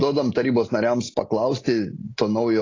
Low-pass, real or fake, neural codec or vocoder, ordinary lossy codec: 7.2 kHz; real; none; AAC, 32 kbps